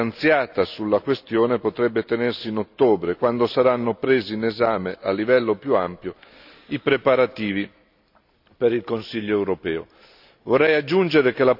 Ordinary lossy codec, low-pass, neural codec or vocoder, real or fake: AAC, 48 kbps; 5.4 kHz; none; real